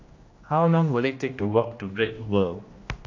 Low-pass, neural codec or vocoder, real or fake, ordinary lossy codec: 7.2 kHz; codec, 16 kHz, 1 kbps, X-Codec, HuBERT features, trained on general audio; fake; AAC, 48 kbps